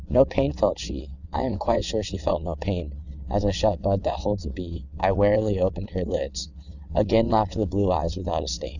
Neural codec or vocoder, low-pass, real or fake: vocoder, 22.05 kHz, 80 mel bands, WaveNeXt; 7.2 kHz; fake